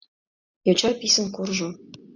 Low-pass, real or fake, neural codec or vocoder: 7.2 kHz; real; none